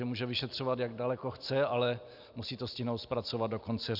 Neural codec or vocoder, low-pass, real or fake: none; 5.4 kHz; real